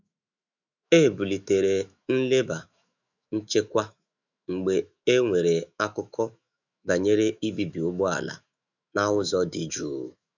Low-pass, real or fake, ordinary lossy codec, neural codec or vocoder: 7.2 kHz; fake; none; autoencoder, 48 kHz, 128 numbers a frame, DAC-VAE, trained on Japanese speech